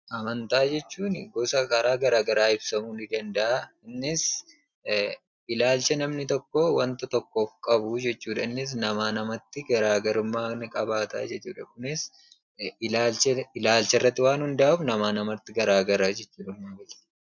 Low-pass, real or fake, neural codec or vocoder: 7.2 kHz; real; none